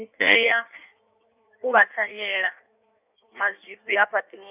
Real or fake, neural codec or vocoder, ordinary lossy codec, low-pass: fake; codec, 16 kHz in and 24 kHz out, 1.1 kbps, FireRedTTS-2 codec; none; 3.6 kHz